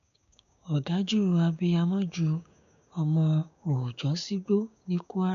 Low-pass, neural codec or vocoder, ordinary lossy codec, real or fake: 7.2 kHz; codec, 16 kHz, 6 kbps, DAC; none; fake